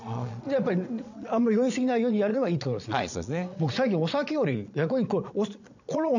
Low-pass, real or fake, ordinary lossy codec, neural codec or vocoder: 7.2 kHz; fake; none; vocoder, 22.05 kHz, 80 mel bands, Vocos